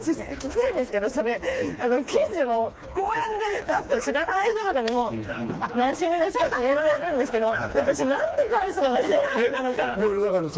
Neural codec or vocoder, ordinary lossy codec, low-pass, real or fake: codec, 16 kHz, 2 kbps, FreqCodec, smaller model; none; none; fake